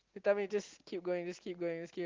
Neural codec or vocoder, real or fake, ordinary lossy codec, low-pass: none; real; Opus, 32 kbps; 7.2 kHz